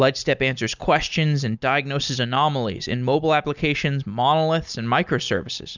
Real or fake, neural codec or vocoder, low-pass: real; none; 7.2 kHz